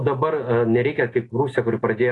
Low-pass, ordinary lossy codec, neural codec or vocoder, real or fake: 10.8 kHz; AAC, 32 kbps; none; real